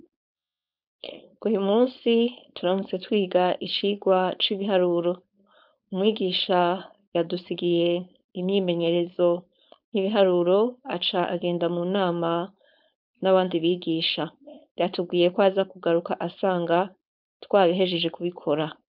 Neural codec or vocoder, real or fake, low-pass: codec, 16 kHz, 4.8 kbps, FACodec; fake; 5.4 kHz